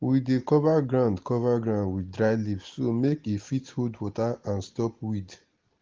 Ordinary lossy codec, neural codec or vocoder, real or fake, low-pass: Opus, 16 kbps; none; real; 7.2 kHz